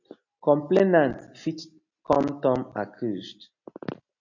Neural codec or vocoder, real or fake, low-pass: none; real; 7.2 kHz